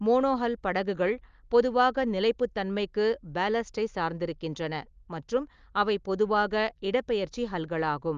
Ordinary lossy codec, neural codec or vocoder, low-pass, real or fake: Opus, 32 kbps; none; 7.2 kHz; real